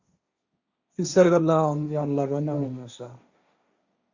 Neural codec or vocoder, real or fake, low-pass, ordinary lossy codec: codec, 16 kHz, 1.1 kbps, Voila-Tokenizer; fake; 7.2 kHz; Opus, 64 kbps